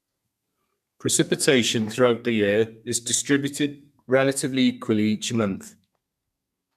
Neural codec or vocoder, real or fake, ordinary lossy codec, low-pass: codec, 32 kHz, 1.9 kbps, SNAC; fake; none; 14.4 kHz